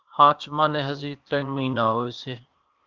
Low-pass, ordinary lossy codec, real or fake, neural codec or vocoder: 7.2 kHz; Opus, 32 kbps; fake; codec, 16 kHz, 0.8 kbps, ZipCodec